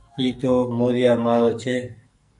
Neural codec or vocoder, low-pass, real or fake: codec, 44.1 kHz, 2.6 kbps, SNAC; 10.8 kHz; fake